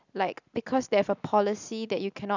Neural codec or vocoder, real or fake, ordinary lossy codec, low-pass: none; real; none; 7.2 kHz